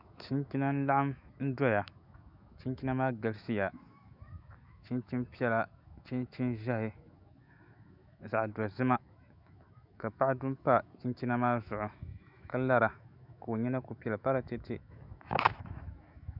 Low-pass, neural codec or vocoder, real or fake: 5.4 kHz; autoencoder, 48 kHz, 128 numbers a frame, DAC-VAE, trained on Japanese speech; fake